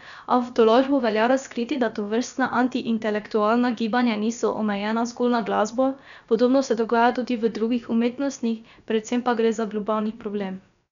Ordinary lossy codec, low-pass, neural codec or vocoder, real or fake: none; 7.2 kHz; codec, 16 kHz, about 1 kbps, DyCAST, with the encoder's durations; fake